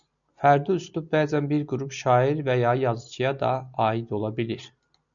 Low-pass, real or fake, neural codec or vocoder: 7.2 kHz; real; none